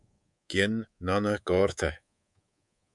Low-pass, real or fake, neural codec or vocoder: 10.8 kHz; fake; codec, 24 kHz, 3.1 kbps, DualCodec